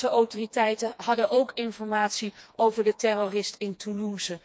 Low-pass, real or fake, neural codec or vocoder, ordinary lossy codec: none; fake; codec, 16 kHz, 2 kbps, FreqCodec, smaller model; none